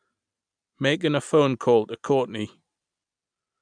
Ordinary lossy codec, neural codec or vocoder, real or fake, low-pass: none; none; real; 9.9 kHz